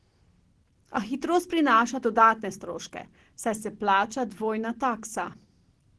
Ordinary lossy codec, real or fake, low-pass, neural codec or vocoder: Opus, 16 kbps; real; 10.8 kHz; none